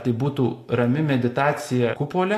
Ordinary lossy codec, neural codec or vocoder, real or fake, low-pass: AAC, 64 kbps; none; real; 14.4 kHz